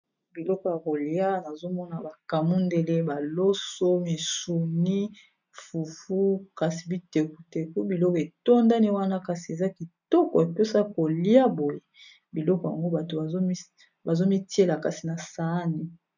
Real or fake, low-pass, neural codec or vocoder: real; 7.2 kHz; none